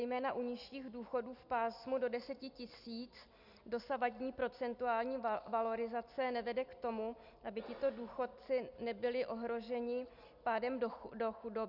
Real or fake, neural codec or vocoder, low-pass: real; none; 5.4 kHz